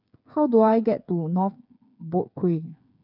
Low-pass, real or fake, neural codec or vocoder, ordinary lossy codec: 5.4 kHz; fake; codec, 16 kHz, 8 kbps, FreqCodec, smaller model; none